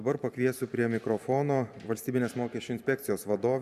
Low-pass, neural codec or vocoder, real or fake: 14.4 kHz; vocoder, 44.1 kHz, 128 mel bands every 512 samples, BigVGAN v2; fake